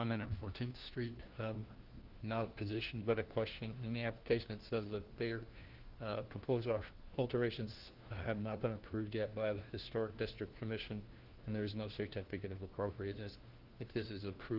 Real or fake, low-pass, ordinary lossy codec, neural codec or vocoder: fake; 5.4 kHz; Opus, 16 kbps; codec, 16 kHz, 1 kbps, FunCodec, trained on LibriTTS, 50 frames a second